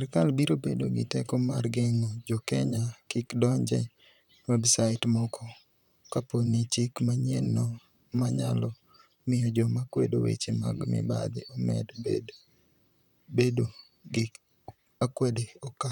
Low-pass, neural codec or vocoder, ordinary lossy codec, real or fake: 19.8 kHz; vocoder, 44.1 kHz, 128 mel bands, Pupu-Vocoder; none; fake